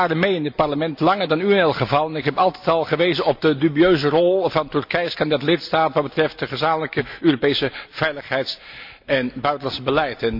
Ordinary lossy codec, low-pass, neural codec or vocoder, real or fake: MP3, 48 kbps; 5.4 kHz; none; real